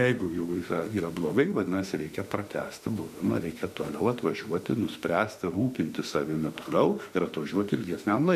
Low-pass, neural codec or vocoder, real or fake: 14.4 kHz; autoencoder, 48 kHz, 32 numbers a frame, DAC-VAE, trained on Japanese speech; fake